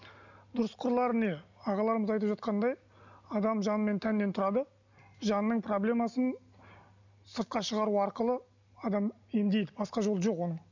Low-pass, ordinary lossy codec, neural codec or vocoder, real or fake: 7.2 kHz; none; none; real